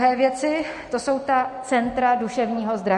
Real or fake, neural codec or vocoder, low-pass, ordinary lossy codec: real; none; 14.4 kHz; MP3, 48 kbps